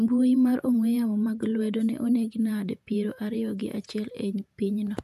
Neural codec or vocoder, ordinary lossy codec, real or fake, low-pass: vocoder, 48 kHz, 128 mel bands, Vocos; none; fake; 14.4 kHz